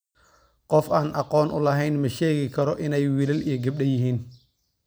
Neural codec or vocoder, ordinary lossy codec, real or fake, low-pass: none; none; real; none